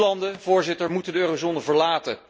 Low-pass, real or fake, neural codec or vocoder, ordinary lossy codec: none; real; none; none